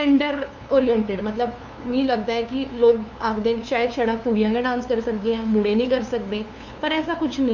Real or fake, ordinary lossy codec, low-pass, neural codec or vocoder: fake; none; 7.2 kHz; codec, 16 kHz, 2 kbps, FunCodec, trained on LibriTTS, 25 frames a second